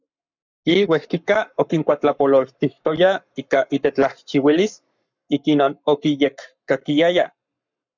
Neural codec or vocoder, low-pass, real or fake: codec, 44.1 kHz, 7.8 kbps, Pupu-Codec; 7.2 kHz; fake